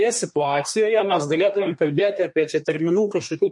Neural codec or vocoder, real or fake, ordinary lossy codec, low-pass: codec, 24 kHz, 1 kbps, SNAC; fake; MP3, 48 kbps; 10.8 kHz